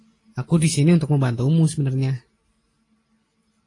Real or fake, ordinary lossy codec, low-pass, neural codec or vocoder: real; AAC, 32 kbps; 10.8 kHz; none